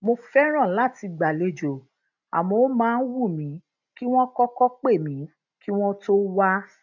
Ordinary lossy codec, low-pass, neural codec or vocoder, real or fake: none; 7.2 kHz; none; real